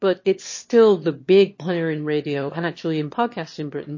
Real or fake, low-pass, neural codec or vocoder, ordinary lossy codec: fake; 7.2 kHz; autoencoder, 22.05 kHz, a latent of 192 numbers a frame, VITS, trained on one speaker; MP3, 32 kbps